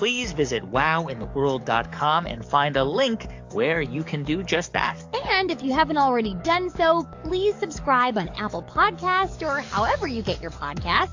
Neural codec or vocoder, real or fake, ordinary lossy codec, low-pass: codec, 44.1 kHz, 7.8 kbps, DAC; fake; AAC, 48 kbps; 7.2 kHz